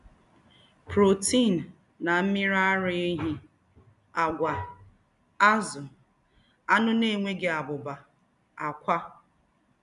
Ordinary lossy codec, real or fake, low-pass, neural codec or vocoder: none; real; 10.8 kHz; none